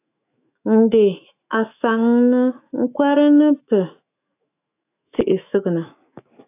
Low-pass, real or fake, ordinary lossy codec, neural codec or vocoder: 3.6 kHz; fake; AAC, 24 kbps; autoencoder, 48 kHz, 128 numbers a frame, DAC-VAE, trained on Japanese speech